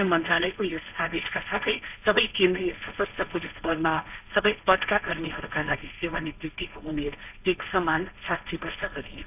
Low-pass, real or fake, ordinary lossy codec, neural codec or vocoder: 3.6 kHz; fake; none; codec, 16 kHz, 1.1 kbps, Voila-Tokenizer